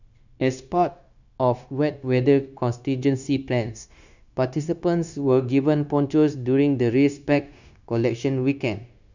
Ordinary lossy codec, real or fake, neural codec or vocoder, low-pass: none; fake; codec, 16 kHz, 0.9 kbps, LongCat-Audio-Codec; 7.2 kHz